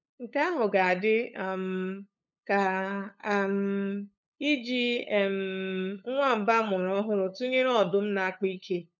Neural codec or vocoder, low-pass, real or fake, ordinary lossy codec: codec, 16 kHz, 8 kbps, FunCodec, trained on LibriTTS, 25 frames a second; 7.2 kHz; fake; none